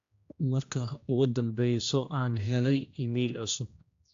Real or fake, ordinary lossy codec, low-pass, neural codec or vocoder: fake; AAC, 48 kbps; 7.2 kHz; codec, 16 kHz, 1 kbps, X-Codec, HuBERT features, trained on general audio